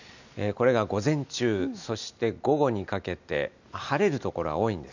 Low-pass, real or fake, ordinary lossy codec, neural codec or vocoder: 7.2 kHz; real; none; none